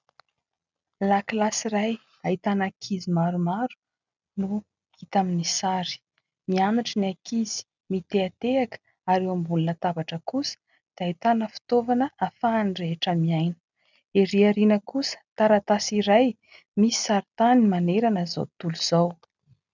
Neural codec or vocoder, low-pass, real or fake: none; 7.2 kHz; real